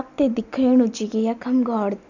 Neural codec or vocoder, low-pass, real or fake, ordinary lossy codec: none; 7.2 kHz; real; none